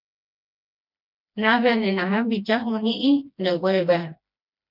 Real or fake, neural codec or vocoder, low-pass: fake; codec, 16 kHz, 1 kbps, FreqCodec, smaller model; 5.4 kHz